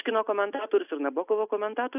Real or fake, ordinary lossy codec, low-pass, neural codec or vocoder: real; AAC, 32 kbps; 3.6 kHz; none